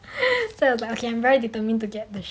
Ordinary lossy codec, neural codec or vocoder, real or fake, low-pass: none; none; real; none